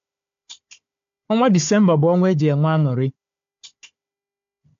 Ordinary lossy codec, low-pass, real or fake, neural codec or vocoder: AAC, 64 kbps; 7.2 kHz; fake; codec, 16 kHz, 4 kbps, FunCodec, trained on Chinese and English, 50 frames a second